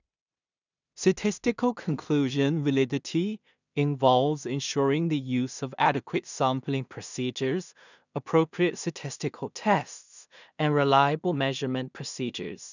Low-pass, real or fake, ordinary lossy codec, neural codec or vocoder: 7.2 kHz; fake; none; codec, 16 kHz in and 24 kHz out, 0.4 kbps, LongCat-Audio-Codec, two codebook decoder